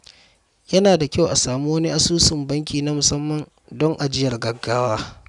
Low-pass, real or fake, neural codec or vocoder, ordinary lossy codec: 10.8 kHz; real; none; none